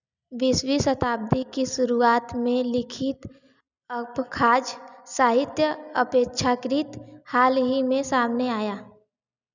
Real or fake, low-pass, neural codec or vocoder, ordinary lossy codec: real; 7.2 kHz; none; none